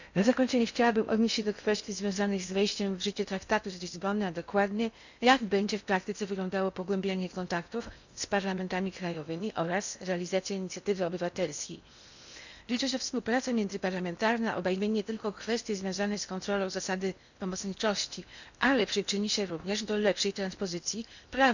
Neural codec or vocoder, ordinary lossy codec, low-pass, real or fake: codec, 16 kHz in and 24 kHz out, 0.8 kbps, FocalCodec, streaming, 65536 codes; none; 7.2 kHz; fake